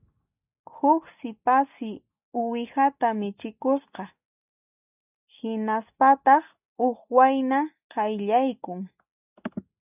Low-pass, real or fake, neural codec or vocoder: 3.6 kHz; real; none